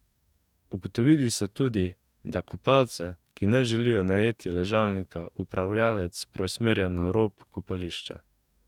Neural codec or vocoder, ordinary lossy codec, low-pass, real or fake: codec, 44.1 kHz, 2.6 kbps, DAC; none; 19.8 kHz; fake